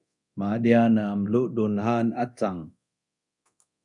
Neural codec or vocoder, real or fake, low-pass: codec, 24 kHz, 0.9 kbps, DualCodec; fake; 10.8 kHz